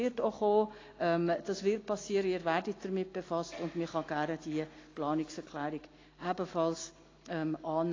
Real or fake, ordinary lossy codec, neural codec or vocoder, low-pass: real; AAC, 32 kbps; none; 7.2 kHz